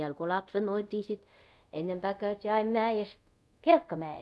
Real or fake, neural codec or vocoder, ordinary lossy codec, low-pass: fake; codec, 24 kHz, 0.5 kbps, DualCodec; none; none